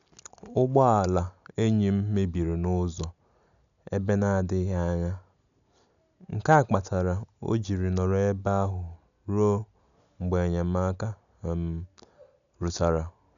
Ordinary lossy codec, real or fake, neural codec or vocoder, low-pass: none; real; none; 7.2 kHz